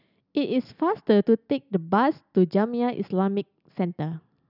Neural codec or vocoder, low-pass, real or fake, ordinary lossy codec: none; 5.4 kHz; real; none